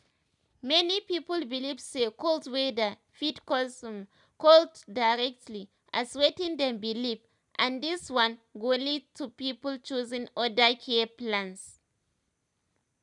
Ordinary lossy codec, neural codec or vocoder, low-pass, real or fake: none; none; 10.8 kHz; real